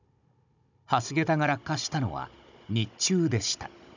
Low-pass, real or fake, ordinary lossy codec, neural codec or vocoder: 7.2 kHz; fake; none; codec, 16 kHz, 16 kbps, FunCodec, trained on Chinese and English, 50 frames a second